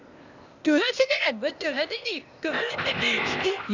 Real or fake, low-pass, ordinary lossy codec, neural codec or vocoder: fake; 7.2 kHz; none; codec, 16 kHz, 0.8 kbps, ZipCodec